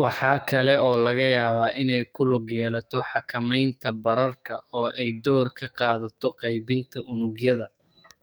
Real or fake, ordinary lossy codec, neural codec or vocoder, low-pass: fake; none; codec, 44.1 kHz, 2.6 kbps, SNAC; none